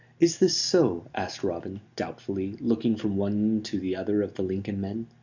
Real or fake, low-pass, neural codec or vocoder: real; 7.2 kHz; none